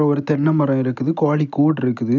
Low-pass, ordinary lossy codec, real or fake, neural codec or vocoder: 7.2 kHz; none; real; none